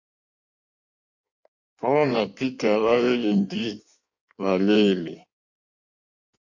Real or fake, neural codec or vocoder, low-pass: fake; codec, 16 kHz in and 24 kHz out, 1.1 kbps, FireRedTTS-2 codec; 7.2 kHz